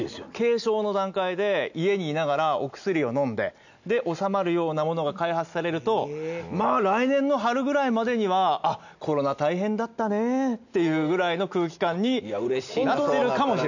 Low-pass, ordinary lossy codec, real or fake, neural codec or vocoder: 7.2 kHz; none; real; none